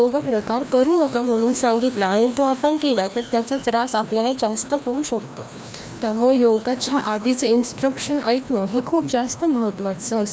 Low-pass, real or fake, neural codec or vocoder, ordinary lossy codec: none; fake; codec, 16 kHz, 1 kbps, FreqCodec, larger model; none